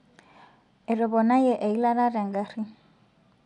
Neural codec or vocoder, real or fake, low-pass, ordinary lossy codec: none; real; 10.8 kHz; none